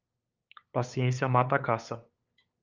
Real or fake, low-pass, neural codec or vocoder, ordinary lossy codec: fake; 7.2 kHz; codec, 16 kHz, 8 kbps, FunCodec, trained on LibriTTS, 25 frames a second; Opus, 24 kbps